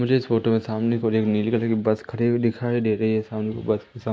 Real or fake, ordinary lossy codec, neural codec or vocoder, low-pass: real; none; none; none